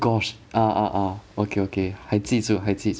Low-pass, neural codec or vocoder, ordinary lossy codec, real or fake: none; none; none; real